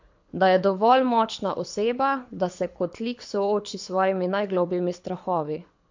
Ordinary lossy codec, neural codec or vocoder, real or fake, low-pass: MP3, 48 kbps; codec, 24 kHz, 6 kbps, HILCodec; fake; 7.2 kHz